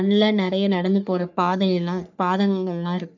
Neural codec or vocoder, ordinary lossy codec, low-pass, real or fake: codec, 44.1 kHz, 3.4 kbps, Pupu-Codec; none; 7.2 kHz; fake